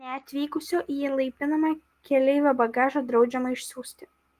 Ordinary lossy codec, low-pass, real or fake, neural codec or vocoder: Opus, 24 kbps; 14.4 kHz; real; none